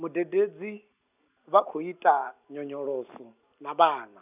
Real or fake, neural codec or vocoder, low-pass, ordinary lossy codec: real; none; 3.6 kHz; none